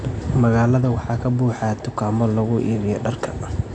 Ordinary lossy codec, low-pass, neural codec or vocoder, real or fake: none; 9.9 kHz; none; real